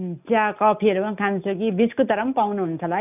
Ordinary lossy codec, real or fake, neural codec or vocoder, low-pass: none; real; none; 3.6 kHz